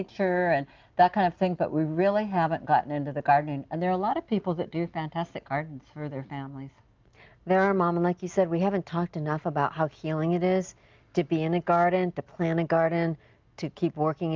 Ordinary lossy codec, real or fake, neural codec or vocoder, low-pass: Opus, 24 kbps; real; none; 7.2 kHz